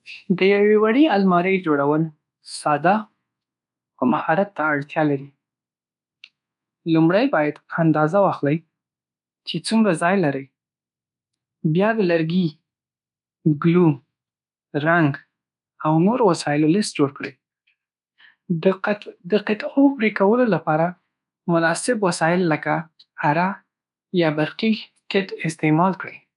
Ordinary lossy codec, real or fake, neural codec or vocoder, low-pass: none; fake; codec, 24 kHz, 1.2 kbps, DualCodec; 10.8 kHz